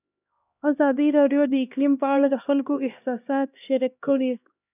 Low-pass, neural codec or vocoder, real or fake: 3.6 kHz; codec, 16 kHz, 1 kbps, X-Codec, HuBERT features, trained on LibriSpeech; fake